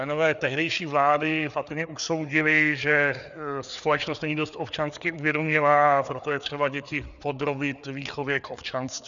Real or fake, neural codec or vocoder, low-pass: fake; codec, 16 kHz, 4 kbps, FreqCodec, larger model; 7.2 kHz